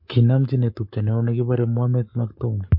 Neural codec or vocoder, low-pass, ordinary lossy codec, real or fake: codec, 44.1 kHz, 7.8 kbps, Pupu-Codec; 5.4 kHz; MP3, 32 kbps; fake